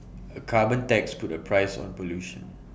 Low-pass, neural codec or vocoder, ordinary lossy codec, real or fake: none; none; none; real